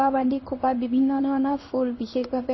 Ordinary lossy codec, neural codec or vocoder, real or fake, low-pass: MP3, 24 kbps; codec, 16 kHz in and 24 kHz out, 1 kbps, XY-Tokenizer; fake; 7.2 kHz